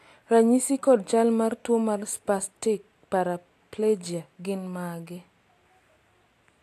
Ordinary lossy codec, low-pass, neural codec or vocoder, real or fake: AAC, 64 kbps; 14.4 kHz; none; real